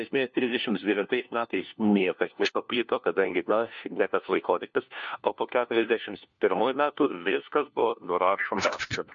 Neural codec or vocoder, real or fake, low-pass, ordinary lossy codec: codec, 16 kHz, 1 kbps, FunCodec, trained on LibriTTS, 50 frames a second; fake; 7.2 kHz; MP3, 48 kbps